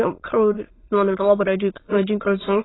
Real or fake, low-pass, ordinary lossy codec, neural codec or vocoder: fake; 7.2 kHz; AAC, 16 kbps; autoencoder, 22.05 kHz, a latent of 192 numbers a frame, VITS, trained on many speakers